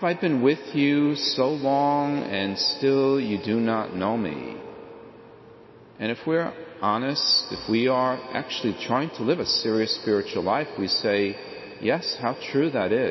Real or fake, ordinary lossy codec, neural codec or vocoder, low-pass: real; MP3, 24 kbps; none; 7.2 kHz